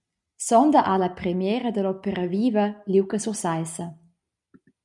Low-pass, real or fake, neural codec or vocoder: 10.8 kHz; real; none